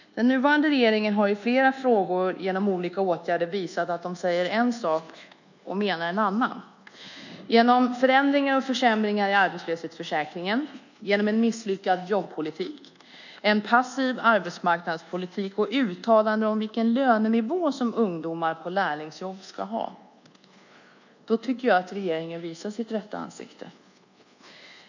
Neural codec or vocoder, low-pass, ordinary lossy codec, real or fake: codec, 24 kHz, 1.2 kbps, DualCodec; 7.2 kHz; none; fake